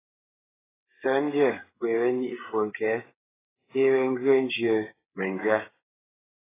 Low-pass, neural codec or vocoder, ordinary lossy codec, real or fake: 3.6 kHz; codec, 16 kHz, 8 kbps, FreqCodec, smaller model; AAC, 16 kbps; fake